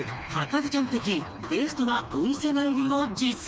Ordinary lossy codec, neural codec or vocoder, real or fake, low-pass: none; codec, 16 kHz, 2 kbps, FreqCodec, smaller model; fake; none